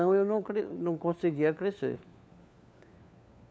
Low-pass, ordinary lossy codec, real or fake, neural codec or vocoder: none; none; fake; codec, 16 kHz, 4 kbps, FunCodec, trained on LibriTTS, 50 frames a second